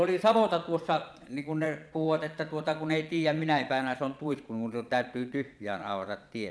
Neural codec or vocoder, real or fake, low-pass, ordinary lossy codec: vocoder, 22.05 kHz, 80 mel bands, Vocos; fake; none; none